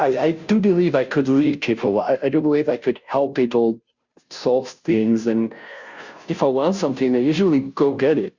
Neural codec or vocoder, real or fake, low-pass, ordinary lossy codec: codec, 16 kHz, 0.5 kbps, FunCodec, trained on Chinese and English, 25 frames a second; fake; 7.2 kHz; Opus, 64 kbps